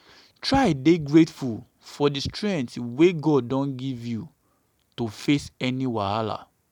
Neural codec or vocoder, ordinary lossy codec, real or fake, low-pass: none; none; real; 19.8 kHz